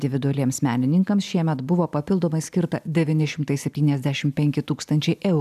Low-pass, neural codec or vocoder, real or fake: 14.4 kHz; none; real